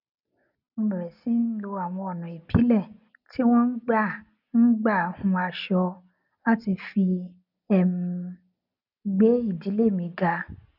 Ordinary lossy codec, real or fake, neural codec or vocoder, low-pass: none; real; none; 5.4 kHz